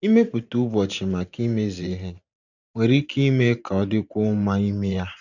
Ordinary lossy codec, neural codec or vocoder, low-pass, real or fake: none; vocoder, 44.1 kHz, 128 mel bands every 512 samples, BigVGAN v2; 7.2 kHz; fake